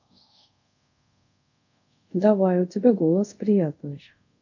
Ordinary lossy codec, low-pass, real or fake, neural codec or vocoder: none; 7.2 kHz; fake; codec, 24 kHz, 0.5 kbps, DualCodec